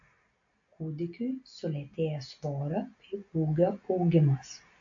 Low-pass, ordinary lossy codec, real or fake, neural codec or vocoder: 7.2 kHz; AAC, 64 kbps; real; none